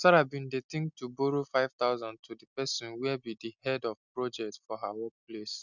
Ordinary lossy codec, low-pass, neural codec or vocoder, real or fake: none; 7.2 kHz; none; real